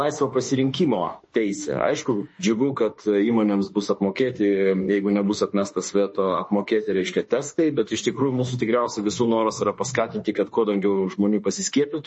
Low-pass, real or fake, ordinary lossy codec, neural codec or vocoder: 10.8 kHz; fake; MP3, 32 kbps; autoencoder, 48 kHz, 32 numbers a frame, DAC-VAE, trained on Japanese speech